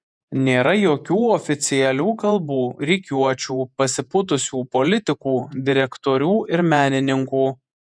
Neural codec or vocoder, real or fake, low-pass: vocoder, 48 kHz, 128 mel bands, Vocos; fake; 9.9 kHz